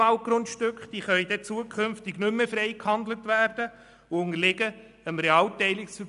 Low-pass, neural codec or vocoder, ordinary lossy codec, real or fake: 10.8 kHz; none; AAC, 96 kbps; real